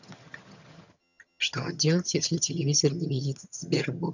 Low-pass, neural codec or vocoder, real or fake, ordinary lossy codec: 7.2 kHz; vocoder, 22.05 kHz, 80 mel bands, HiFi-GAN; fake; none